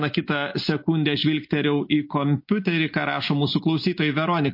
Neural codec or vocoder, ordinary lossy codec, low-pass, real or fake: none; MP3, 32 kbps; 5.4 kHz; real